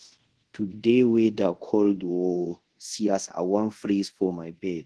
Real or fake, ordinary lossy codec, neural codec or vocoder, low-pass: fake; Opus, 16 kbps; codec, 24 kHz, 0.5 kbps, DualCodec; 10.8 kHz